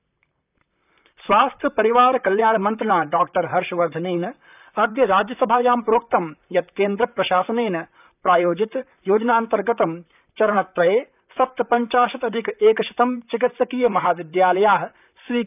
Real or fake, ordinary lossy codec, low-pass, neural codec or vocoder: fake; none; 3.6 kHz; vocoder, 44.1 kHz, 128 mel bands, Pupu-Vocoder